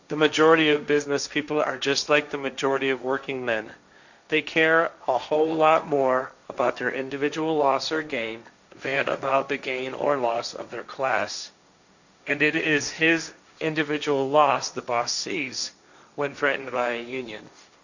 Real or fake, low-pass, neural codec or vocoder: fake; 7.2 kHz; codec, 16 kHz, 1.1 kbps, Voila-Tokenizer